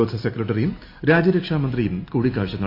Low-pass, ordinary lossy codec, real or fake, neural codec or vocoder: 5.4 kHz; AAC, 32 kbps; real; none